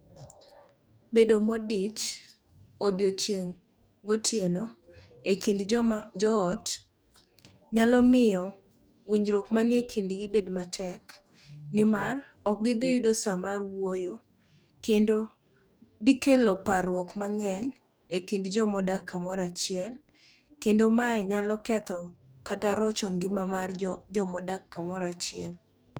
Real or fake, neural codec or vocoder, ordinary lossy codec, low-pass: fake; codec, 44.1 kHz, 2.6 kbps, DAC; none; none